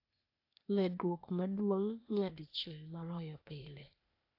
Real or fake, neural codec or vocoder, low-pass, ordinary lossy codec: fake; codec, 16 kHz, 0.8 kbps, ZipCodec; 5.4 kHz; AAC, 32 kbps